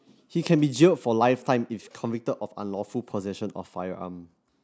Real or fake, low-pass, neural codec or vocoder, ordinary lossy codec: real; none; none; none